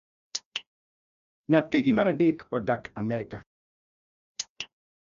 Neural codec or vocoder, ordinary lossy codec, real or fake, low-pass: codec, 16 kHz, 1 kbps, FreqCodec, larger model; none; fake; 7.2 kHz